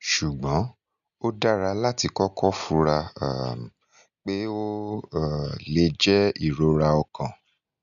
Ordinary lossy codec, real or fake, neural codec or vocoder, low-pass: none; real; none; 7.2 kHz